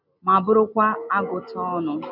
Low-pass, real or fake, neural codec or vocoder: 5.4 kHz; real; none